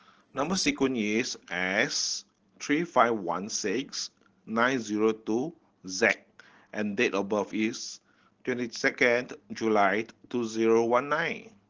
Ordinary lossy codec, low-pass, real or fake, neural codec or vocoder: Opus, 16 kbps; 7.2 kHz; real; none